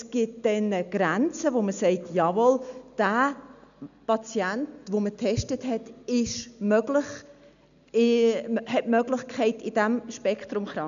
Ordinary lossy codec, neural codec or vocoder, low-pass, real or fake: none; none; 7.2 kHz; real